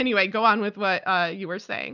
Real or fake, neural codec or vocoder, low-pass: real; none; 7.2 kHz